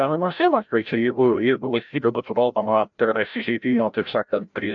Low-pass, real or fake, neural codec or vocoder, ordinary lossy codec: 7.2 kHz; fake; codec, 16 kHz, 0.5 kbps, FreqCodec, larger model; MP3, 64 kbps